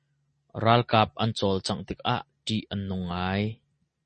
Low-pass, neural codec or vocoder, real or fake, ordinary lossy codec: 10.8 kHz; none; real; MP3, 32 kbps